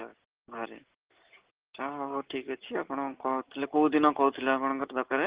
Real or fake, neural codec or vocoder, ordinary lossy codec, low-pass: real; none; Opus, 16 kbps; 3.6 kHz